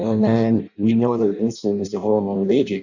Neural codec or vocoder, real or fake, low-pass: codec, 16 kHz in and 24 kHz out, 0.6 kbps, FireRedTTS-2 codec; fake; 7.2 kHz